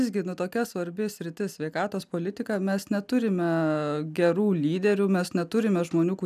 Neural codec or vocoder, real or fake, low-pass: none; real; 14.4 kHz